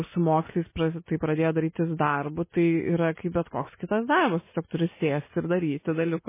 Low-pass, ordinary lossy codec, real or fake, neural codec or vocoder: 3.6 kHz; MP3, 16 kbps; real; none